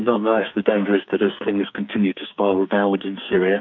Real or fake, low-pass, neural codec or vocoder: fake; 7.2 kHz; codec, 44.1 kHz, 2.6 kbps, SNAC